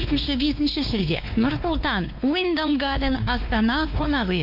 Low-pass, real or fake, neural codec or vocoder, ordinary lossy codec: 5.4 kHz; fake; codec, 16 kHz, 2 kbps, X-Codec, WavLM features, trained on Multilingual LibriSpeech; AAC, 48 kbps